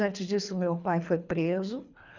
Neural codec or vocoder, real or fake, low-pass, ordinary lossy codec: codec, 24 kHz, 3 kbps, HILCodec; fake; 7.2 kHz; none